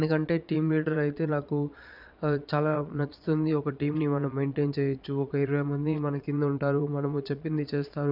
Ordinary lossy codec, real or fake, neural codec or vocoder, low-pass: none; fake; vocoder, 22.05 kHz, 80 mel bands, Vocos; 5.4 kHz